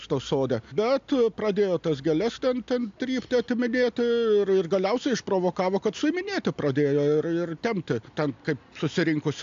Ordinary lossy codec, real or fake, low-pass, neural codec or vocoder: AAC, 64 kbps; real; 7.2 kHz; none